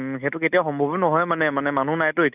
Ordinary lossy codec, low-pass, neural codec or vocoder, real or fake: none; 3.6 kHz; none; real